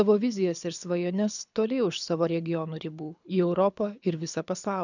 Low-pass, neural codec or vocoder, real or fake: 7.2 kHz; codec, 24 kHz, 6 kbps, HILCodec; fake